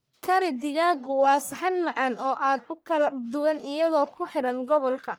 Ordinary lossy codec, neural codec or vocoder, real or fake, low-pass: none; codec, 44.1 kHz, 1.7 kbps, Pupu-Codec; fake; none